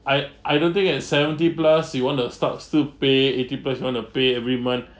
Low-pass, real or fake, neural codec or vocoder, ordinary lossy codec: none; real; none; none